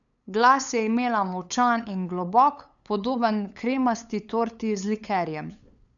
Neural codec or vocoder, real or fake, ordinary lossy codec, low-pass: codec, 16 kHz, 8 kbps, FunCodec, trained on LibriTTS, 25 frames a second; fake; none; 7.2 kHz